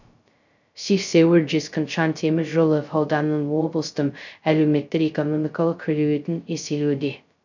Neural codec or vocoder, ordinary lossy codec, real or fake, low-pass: codec, 16 kHz, 0.2 kbps, FocalCodec; none; fake; 7.2 kHz